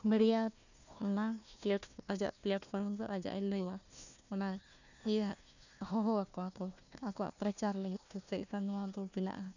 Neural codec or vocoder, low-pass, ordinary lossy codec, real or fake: codec, 16 kHz, 1 kbps, FunCodec, trained on Chinese and English, 50 frames a second; 7.2 kHz; none; fake